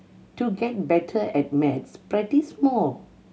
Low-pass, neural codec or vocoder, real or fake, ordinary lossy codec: none; none; real; none